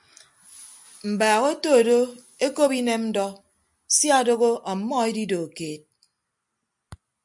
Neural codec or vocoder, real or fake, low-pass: none; real; 10.8 kHz